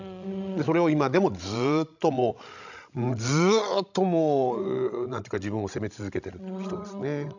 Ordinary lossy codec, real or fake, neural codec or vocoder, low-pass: none; fake; codec, 16 kHz, 16 kbps, FreqCodec, larger model; 7.2 kHz